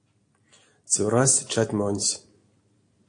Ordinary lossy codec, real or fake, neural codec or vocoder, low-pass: AAC, 48 kbps; real; none; 9.9 kHz